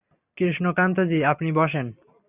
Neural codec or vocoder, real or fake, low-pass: none; real; 3.6 kHz